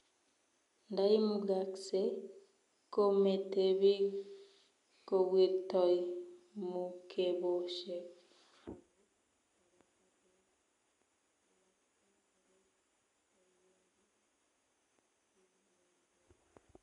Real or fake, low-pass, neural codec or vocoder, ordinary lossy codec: real; 10.8 kHz; none; none